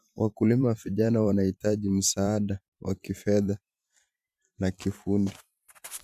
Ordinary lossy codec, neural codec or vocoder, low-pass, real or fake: MP3, 96 kbps; vocoder, 44.1 kHz, 128 mel bands every 512 samples, BigVGAN v2; 14.4 kHz; fake